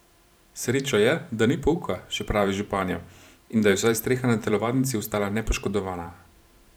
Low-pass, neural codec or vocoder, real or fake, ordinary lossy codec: none; vocoder, 44.1 kHz, 128 mel bands every 512 samples, BigVGAN v2; fake; none